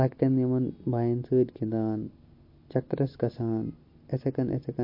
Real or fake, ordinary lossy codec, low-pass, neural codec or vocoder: real; MP3, 32 kbps; 5.4 kHz; none